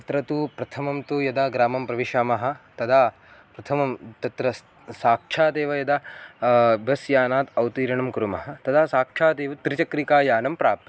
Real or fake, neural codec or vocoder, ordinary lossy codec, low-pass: real; none; none; none